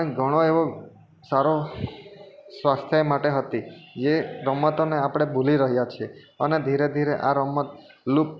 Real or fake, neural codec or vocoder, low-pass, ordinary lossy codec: real; none; none; none